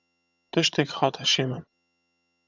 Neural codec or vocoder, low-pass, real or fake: vocoder, 22.05 kHz, 80 mel bands, HiFi-GAN; 7.2 kHz; fake